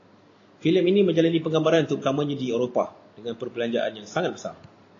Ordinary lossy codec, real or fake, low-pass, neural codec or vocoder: AAC, 32 kbps; real; 7.2 kHz; none